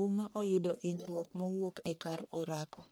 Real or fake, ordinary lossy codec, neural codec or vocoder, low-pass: fake; none; codec, 44.1 kHz, 1.7 kbps, Pupu-Codec; none